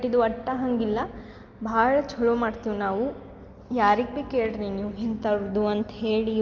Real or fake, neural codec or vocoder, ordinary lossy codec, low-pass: real; none; Opus, 32 kbps; 7.2 kHz